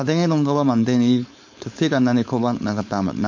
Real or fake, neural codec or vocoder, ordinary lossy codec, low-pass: fake; codec, 16 kHz, 4.8 kbps, FACodec; MP3, 48 kbps; 7.2 kHz